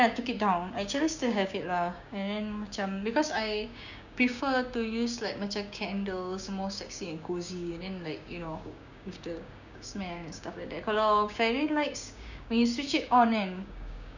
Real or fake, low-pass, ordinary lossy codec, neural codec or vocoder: fake; 7.2 kHz; none; codec, 16 kHz, 6 kbps, DAC